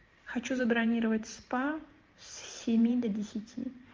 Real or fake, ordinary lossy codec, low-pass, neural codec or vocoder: fake; Opus, 32 kbps; 7.2 kHz; vocoder, 44.1 kHz, 128 mel bands every 512 samples, BigVGAN v2